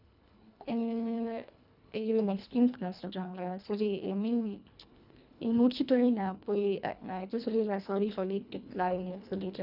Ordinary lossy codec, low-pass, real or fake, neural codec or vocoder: none; 5.4 kHz; fake; codec, 24 kHz, 1.5 kbps, HILCodec